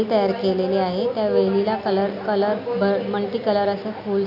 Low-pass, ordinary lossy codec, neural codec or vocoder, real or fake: 5.4 kHz; none; none; real